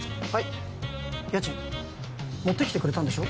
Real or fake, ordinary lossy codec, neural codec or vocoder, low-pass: real; none; none; none